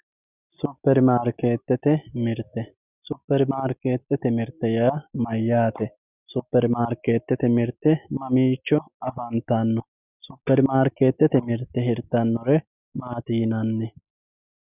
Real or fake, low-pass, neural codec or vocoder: real; 3.6 kHz; none